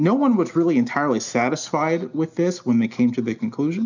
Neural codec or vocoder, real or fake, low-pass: none; real; 7.2 kHz